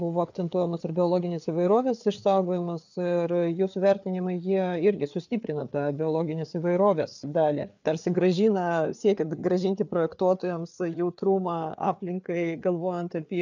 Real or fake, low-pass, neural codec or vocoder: fake; 7.2 kHz; codec, 16 kHz, 4 kbps, FreqCodec, larger model